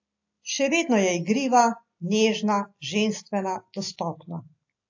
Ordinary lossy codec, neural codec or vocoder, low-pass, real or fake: AAC, 48 kbps; none; 7.2 kHz; real